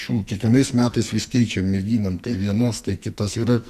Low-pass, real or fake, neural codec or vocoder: 14.4 kHz; fake; codec, 44.1 kHz, 2.6 kbps, SNAC